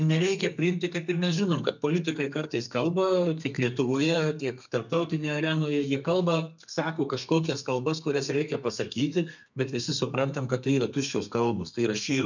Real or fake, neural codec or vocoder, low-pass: fake; codec, 32 kHz, 1.9 kbps, SNAC; 7.2 kHz